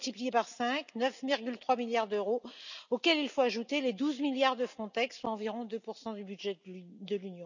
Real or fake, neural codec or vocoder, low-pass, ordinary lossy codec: real; none; 7.2 kHz; none